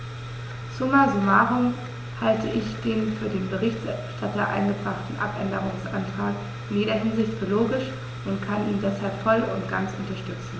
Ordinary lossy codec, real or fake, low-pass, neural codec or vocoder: none; real; none; none